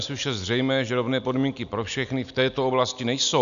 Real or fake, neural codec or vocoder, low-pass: real; none; 7.2 kHz